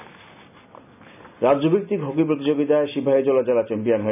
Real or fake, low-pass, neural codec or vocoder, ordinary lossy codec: real; 3.6 kHz; none; none